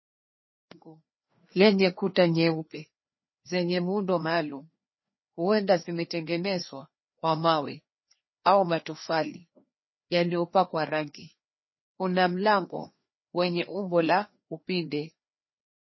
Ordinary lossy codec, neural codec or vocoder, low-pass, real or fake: MP3, 24 kbps; codec, 16 kHz, 2 kbps, FreqCodec, larger model; 7.2 kHz; fake